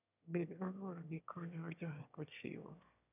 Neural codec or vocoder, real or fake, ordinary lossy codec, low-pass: autoencoder, 22.05 kHz, a latent of 192 numbers a frame, VITS, trained on one speaker; fake; none; 3.6 kHz